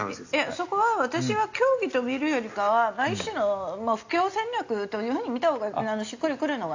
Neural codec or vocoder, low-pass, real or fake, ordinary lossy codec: none; 7.2 kHz; real; AAC, 48 kbps